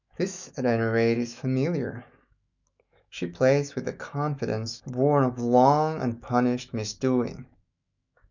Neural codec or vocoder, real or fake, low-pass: autoencoder, 48 kHz, 128 numbers a frame, DAC-VAE, trained on Japanese speech; fake; 7.2 kHz